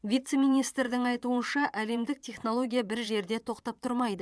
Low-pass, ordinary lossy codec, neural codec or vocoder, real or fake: none; none; vocoder, 22.05 kHz, 80 mel bands, Vocos; fake